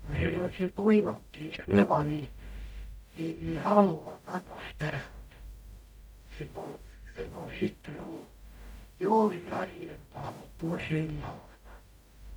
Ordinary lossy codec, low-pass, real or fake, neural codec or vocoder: none; none; fake; codec, 44.1 kHz, 0.9 kbps, DAC